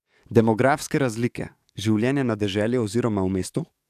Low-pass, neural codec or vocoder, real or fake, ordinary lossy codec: 14.4 kHz; codec, 44.1 kHz, 7.8 kbps, DAC; fake; none